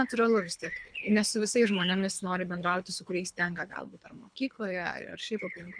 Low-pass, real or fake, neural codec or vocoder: 9.9 kHz; fake; codec, 24 kHz, 3 kbps, HILCodec